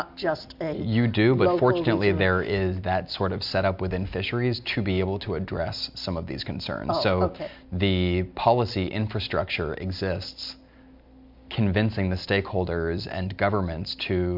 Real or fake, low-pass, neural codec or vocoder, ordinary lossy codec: real; 5.4 kHz; none; MP3, 48 kbps